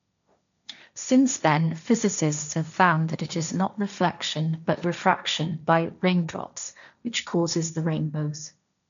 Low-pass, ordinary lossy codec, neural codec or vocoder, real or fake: 7.2 kHz; none; codec, 16 kHz, 1.1 kbps, Voila-Tokenizer; fake